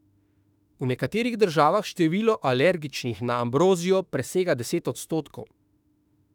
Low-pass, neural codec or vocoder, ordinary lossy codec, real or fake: 19.8 kHz; autoencoder, 48 kHz, 32 numbers a frame, DAC-VAE, trained on Japanese speech; none; fake